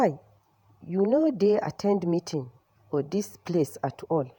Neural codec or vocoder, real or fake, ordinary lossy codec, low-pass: vocoder, 48 kHz, 128 mel bands, Vocos; fake; none; 19.8 kHz